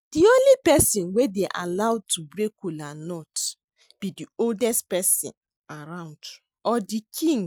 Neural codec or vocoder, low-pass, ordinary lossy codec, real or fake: none; none; none; real